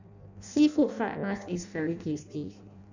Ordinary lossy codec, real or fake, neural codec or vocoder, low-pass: none; fake; codec, 16 kHz in and 24 kHz out, 0.6 kbps, FireRedTTS-2 codec; 7.2 kHz